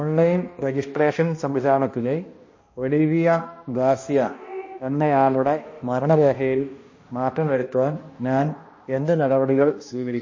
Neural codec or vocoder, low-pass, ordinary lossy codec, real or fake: codec, 16 kHz, 1 kbps, X-Codec, HuBERT features, trained on balanced general audio; 7.2 kHz; MP3, 32 kbps; fake